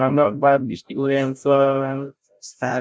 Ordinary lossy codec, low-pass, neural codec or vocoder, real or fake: none; none; codec, 16 kHz, 0.5 kbps, FreqCodec, larger model; fake